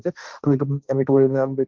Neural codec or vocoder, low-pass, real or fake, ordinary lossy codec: codec, 16 kHz, 1.1 kbps, Voila-Tokenizer; 7.2 kHz; fake; Opus, 24 kbps